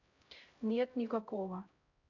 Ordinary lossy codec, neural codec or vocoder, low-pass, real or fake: MP3, 64 kbps; codec, 16 kHz, 0.5 kbps, X-Codec, HuBERT features, trained on LibriSpeech; 7.2 kHz; fake